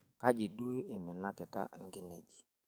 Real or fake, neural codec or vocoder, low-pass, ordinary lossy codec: fake; codec, 44.1 kHz, 7.8 kbps, DAC; none; none